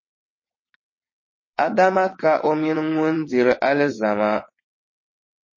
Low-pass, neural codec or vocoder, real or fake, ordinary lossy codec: 7.2 kHz; vocoder, 22.05 kHz, 80 mel bands, WaveNeXt; fake; MP3, 32 kbps